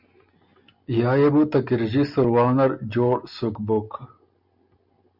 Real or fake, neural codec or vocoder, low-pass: real; none; 5.4 kHz